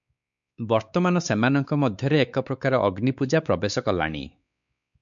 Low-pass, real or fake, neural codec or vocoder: 7.2 kHz; fake; codec, 16 kHz, 4 kbps, X-Codec, WavLM features, trained on Multilingual LibriSpeech